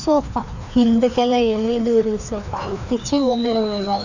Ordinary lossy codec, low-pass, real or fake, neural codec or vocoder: none; 7.2 kHz; fake; codec, 16 kHz, 2 kbps, FreqCodec, larger model